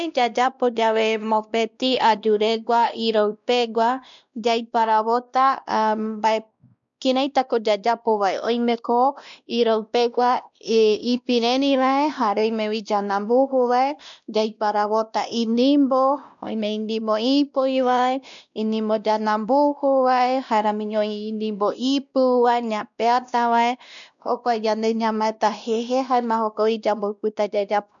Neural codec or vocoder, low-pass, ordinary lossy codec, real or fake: codec, 16 kHz, 1 kbps, X-Codec, WavLM features, trained on Multilingual LibriSpeech; 7.2 kHz; none; fake